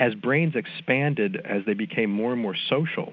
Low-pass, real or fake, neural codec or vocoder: 7.2 kHz; real; none